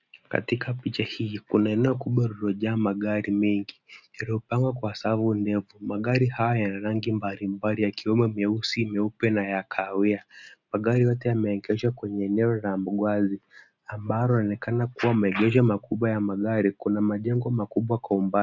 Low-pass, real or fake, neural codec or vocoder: 7.2 kHz; real; none